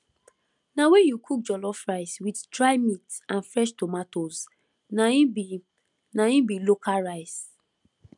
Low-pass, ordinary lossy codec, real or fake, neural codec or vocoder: 10.8 kHz; none; real; none